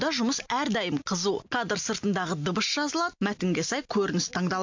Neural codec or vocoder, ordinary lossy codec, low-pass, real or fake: none; MP3, 64 kbps; 7.2 kHz; real